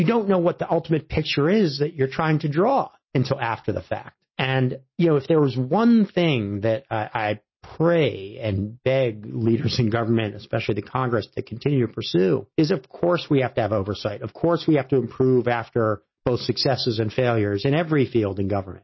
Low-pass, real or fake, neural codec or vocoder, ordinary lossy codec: 7.2 kHz; real; none; MP3, 24 kbps